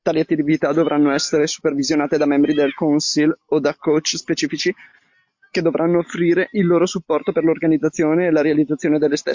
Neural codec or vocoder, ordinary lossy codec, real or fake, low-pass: none; MP3, 48 kbps; real; 7.2 kHz